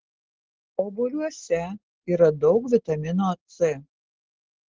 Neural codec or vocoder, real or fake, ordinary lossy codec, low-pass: none; real; Opus, 16 kbps; 7.2 kHz